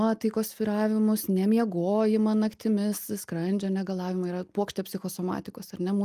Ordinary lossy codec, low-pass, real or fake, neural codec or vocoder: Opus, 32 kbps; 14.4 kHz; real; none